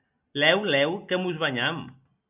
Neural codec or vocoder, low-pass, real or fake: none; 3.6 kHz; real